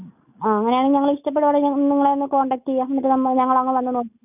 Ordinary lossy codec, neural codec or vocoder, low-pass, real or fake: none; none; 3.6 kHz; real